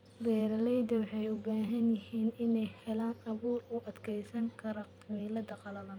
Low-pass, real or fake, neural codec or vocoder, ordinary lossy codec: 19.8 kHz; fake; vocoder, 44.1 kHz, 128 mel bands every 512 samples, BigVGAN v2; none